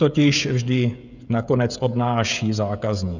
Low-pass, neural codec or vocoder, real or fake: 7.2 kHz; codec, 16 kHz, 16 kbps, FreqCodec, smaller model; fake